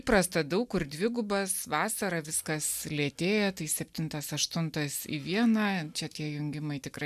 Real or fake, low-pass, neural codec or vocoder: real; 14.4 kHz; none